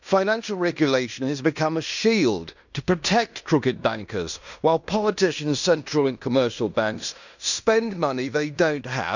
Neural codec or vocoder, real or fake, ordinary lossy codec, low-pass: codec, 16 kHz in and 24 kHz out, 0.9 kbps, LongCat-Audio-Codec, fine tuned four codebook decoder; fake; none; 7.2 kHz